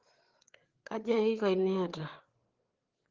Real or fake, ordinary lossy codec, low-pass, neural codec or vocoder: fake; Opus, 16 kbps; 7.2 kHz; codec, 16 kHz, 4 kbps, FreqCodec, larger model